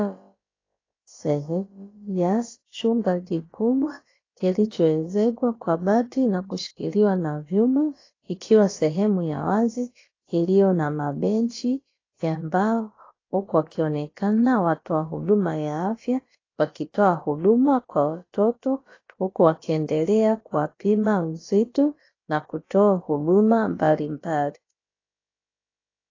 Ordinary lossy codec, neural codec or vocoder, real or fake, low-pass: AAC, 32 kbps; codec, 16 kHz, about 1 kbps, DyCAST, with the encoder's durations; fake; 7.2 kHz